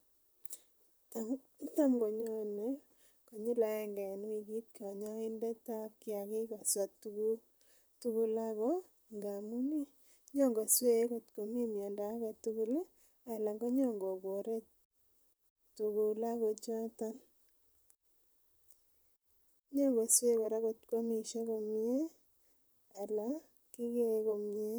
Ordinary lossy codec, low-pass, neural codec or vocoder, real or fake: none; none; vocoder, 44.1 kHz, 128 mel bands, Pupu-Vocoder; fake